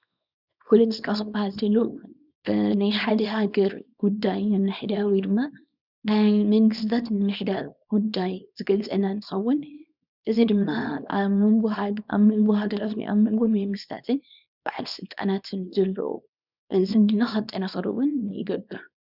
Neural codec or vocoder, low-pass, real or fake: codec, 24 kHz, 0.9 kbps, WavTokenizer, small release; 5.4 kHz; fake